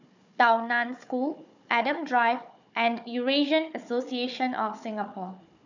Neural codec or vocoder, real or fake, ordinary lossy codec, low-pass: codec, 16 kHz, 4 kbps, FunCodec, trained on Chinese and English, 50 frames a second; fake; none; 7.2 kHz